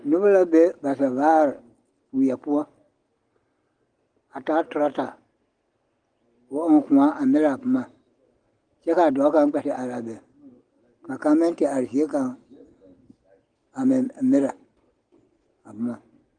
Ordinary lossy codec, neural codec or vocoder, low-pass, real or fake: Opus, 24 kbps; none; 9.9 kHz; real